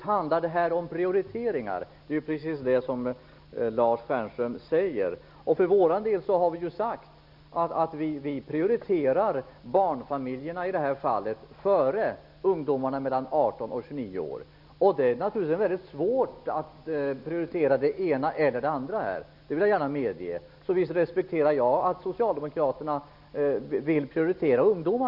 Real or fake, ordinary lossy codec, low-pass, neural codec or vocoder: real; none; 5.4 kHz; none